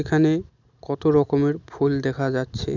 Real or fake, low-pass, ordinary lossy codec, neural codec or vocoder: real; 7.2 kHz; none; none